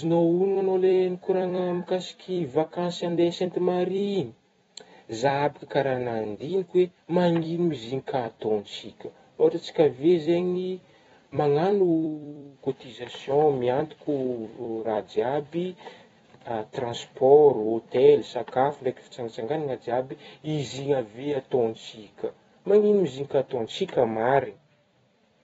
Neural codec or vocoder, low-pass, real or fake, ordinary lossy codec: vocoder, 44.1 kHz, 128 mel bands every 256 samples, BigVGAN v2; 19.8 kHz; fake; AAC, 24 kbps